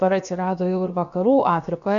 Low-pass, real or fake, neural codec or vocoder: 7.2 kHz; fake; codec, 16 kHz, about 1 kbps, DyCAST, with the encoder's durations